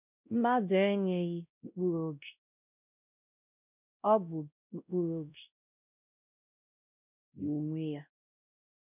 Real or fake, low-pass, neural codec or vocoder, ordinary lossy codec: fake; 3.6 kHz; codec, 16 kHz, 0.5 kbps, X-Codec, WavLM features, trained on Multilingual LibriSpeech; none